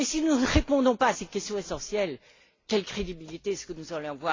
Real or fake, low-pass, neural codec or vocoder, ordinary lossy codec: real; 7.2 kHz; none; AAC, 32 kbps